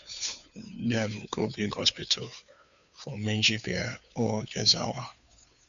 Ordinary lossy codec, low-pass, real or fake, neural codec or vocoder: AAC, 96 kbps; 7.2 kHz; fake; codec, 16 kHz, 2 kbps, FunCodec, trained on Chinese and English, 25 frames a second